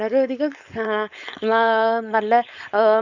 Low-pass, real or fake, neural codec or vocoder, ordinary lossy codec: 7.2 kHz; fake; codec, 16 kHz, 4.8 kbps, FACodec; none